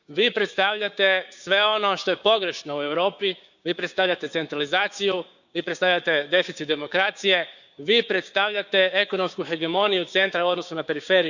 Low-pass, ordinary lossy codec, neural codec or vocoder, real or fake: 7.2 kHz; none; codec, 16 kHz, 6 kbps, DAC; fake